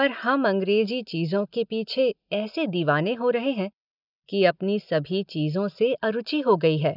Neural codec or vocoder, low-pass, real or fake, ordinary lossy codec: none; 5.4 kHz; real; none